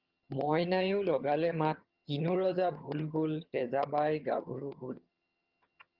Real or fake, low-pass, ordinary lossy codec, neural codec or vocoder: fake; 5.4 kHz; Opus, 32 kbps; vocoder, 22.05 kHz, 80 mel bands, HiFi-GAN